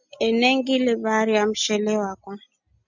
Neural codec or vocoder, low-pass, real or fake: none; 7.2 kHz; real